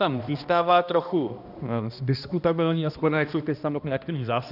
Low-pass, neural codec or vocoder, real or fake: 5.4 kHz; codec, 16 kHz, 1 kbps, X-Codec, HuBERT features, trained on balanced general audio; fake